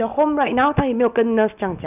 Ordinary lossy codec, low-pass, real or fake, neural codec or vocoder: none; 3.6 kHz; real; none